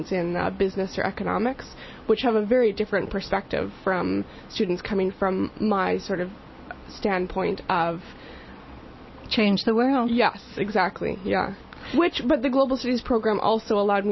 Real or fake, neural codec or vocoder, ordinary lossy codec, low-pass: real; none; MP3, 24 kbps; 7.2 kHz